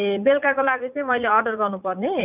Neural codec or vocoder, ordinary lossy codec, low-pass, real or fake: vocoder, 44.1 kHz, 80 mel bands, Vocos; none; 3.6 kHz; fake